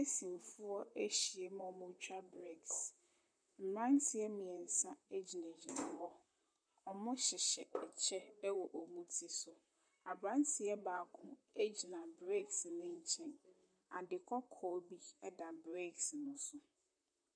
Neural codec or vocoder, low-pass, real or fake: vocoder, 44.1 kHz, 128 mel bands, Pupu-Vocoder; 9.9 kHz; fake